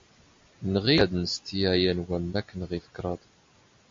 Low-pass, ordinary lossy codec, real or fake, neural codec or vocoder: 7.2 kHz; MP3, 48 kbps; real; none